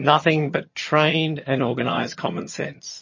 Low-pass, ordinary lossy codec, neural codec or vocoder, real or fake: 7.2 kHz; MP3, 32 kbps; vocoder, 22.05 kHz, 80 mel bands, HiFi-GAN; fake